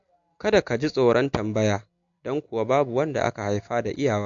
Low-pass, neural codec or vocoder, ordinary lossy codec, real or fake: 7.2 kHz; none; MP3, 48 kbps; real